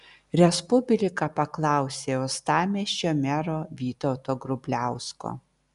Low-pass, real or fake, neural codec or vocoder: 10.8 kHz; real; none